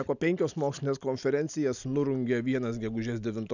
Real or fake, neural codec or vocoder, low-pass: real; none; 7.2 kHz